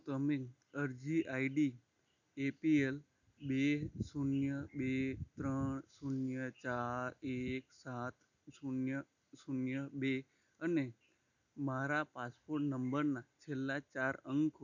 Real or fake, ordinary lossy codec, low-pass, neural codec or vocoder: real; none; 7.2 kHz; none